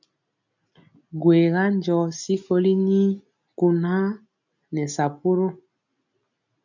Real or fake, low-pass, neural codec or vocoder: real; 7.2 kHz; none